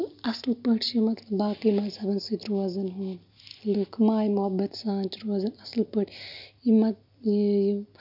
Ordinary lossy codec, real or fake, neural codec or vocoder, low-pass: none; real; none; 5.4 kHz